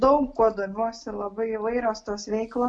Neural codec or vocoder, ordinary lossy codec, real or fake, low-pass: none; MP3, 64 kbps; real; 9.9 kHz